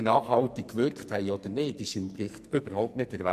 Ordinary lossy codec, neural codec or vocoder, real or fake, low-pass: MP3, 64 kbps; codec, 44.1 kHz, 2.6 kbps, SNAC; fake; 14.4 kHz